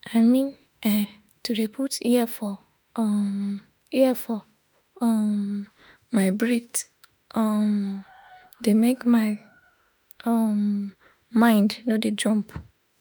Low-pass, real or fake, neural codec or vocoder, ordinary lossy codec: none; fake; autoencoder, 48 kHz, 32 numbers a frame, DAC-VAE, trained on Japanese speech; none